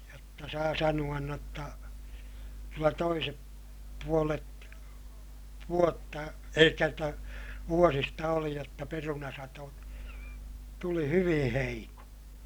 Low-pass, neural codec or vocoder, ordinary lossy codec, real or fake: none; none; none; real